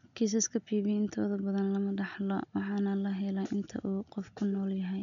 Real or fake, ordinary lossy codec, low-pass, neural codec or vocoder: real; none; 7.2 kHz; none